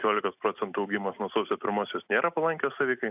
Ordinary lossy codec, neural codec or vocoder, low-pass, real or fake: AAC, 32 kbps; none; 3.6 kHz; real